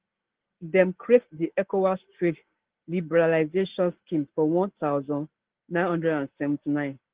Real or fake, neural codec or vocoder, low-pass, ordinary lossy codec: real; none; 3.6 kHz; Opus, 16 kbps